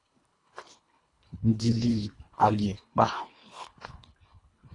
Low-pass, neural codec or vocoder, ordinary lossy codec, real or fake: 10.8 kHz; codec, 24 kHz, 1.5 kbps, HILCodec; AAC, 32 kbps; fake